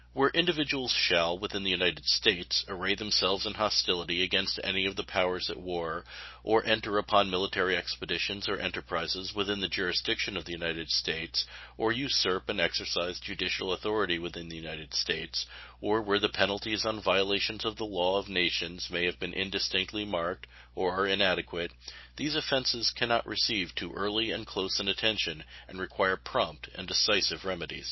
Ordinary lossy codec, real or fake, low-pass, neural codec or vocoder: MP3, 24 kbps; real; 7.2 kHz; none